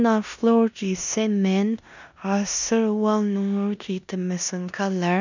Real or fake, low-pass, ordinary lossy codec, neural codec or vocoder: fake; 7.2 kHz; none; codec, 16 kHz in and 24 kHz out, 0.9 kbps, LongCat-Audio-Codec, four codebook decoder